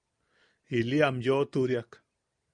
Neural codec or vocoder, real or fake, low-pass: none; real; 9.9 kHz